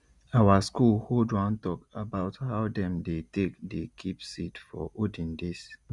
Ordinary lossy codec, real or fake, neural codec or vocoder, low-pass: Opus, 64 kbps; real; none; 10.8 kHz